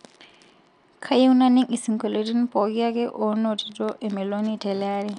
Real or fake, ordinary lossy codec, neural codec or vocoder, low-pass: real; none; none; 10.8 kHz